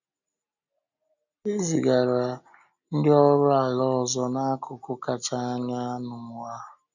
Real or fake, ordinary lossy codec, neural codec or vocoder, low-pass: real; none; none; 7.2 kHz